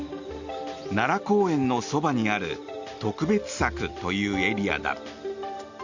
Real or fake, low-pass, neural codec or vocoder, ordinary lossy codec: real; 7.2 kHz; none; Opus, 64 kbps